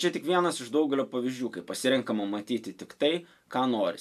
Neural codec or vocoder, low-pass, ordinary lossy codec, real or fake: none; 14.4 kHz; AAC, 96 kbps; real